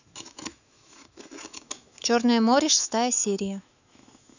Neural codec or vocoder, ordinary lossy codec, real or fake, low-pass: autoencoder, 48 kHz, 128 numbers a frame, DAC-VAE, trained on Japanese speech; none; fake; 7.2 kHz